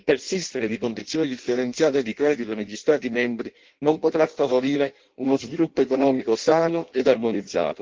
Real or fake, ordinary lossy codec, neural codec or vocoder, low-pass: fake; Opus, 16 kbps; codec, 16 kHz in and 24 kHz out, 0.6 kbps, FireRedTTS-2 codec; 7.2 kHz